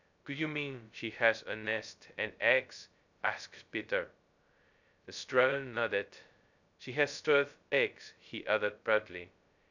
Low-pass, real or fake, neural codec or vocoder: 7.2 kHz; fake; codec, 16 kHz, 0.2 kbps, FocalCodec